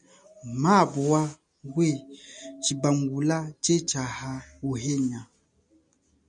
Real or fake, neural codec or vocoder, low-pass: real; none; 9.9 kHz